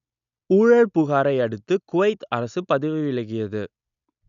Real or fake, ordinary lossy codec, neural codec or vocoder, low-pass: real; none; none; 7.2 kHz